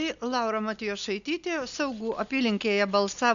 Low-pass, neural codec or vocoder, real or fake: 7.2 kHz; none; real